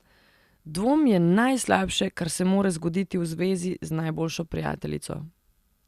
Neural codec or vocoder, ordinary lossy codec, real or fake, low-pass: none; Opus, 64 kbps; real; 14.4 kHz